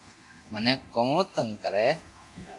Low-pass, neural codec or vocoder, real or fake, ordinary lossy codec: 10.8 kHz; codec, 24 kHz, 0.9 kbps, DualCodec; fake; AAC, 64 kbps